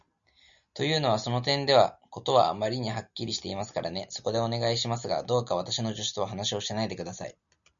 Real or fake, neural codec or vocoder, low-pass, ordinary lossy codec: real; none; 7.2 kHz; MP3, 96 kbps